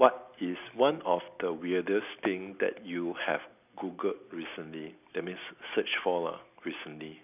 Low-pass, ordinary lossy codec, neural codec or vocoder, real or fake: 3.6 kHz; none; none; real